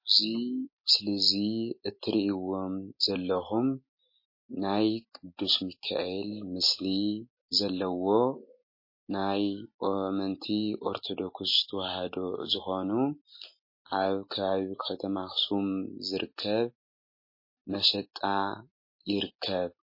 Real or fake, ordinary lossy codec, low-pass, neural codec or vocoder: real; MP3, 24 kbps; 5.4 kHz; none